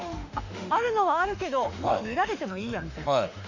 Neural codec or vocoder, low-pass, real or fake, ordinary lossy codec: autoencoder, 48 kHz, 32 numbers a frame, DAC-VAE, trained on Japanese speech; 7.2 kHz; fake; none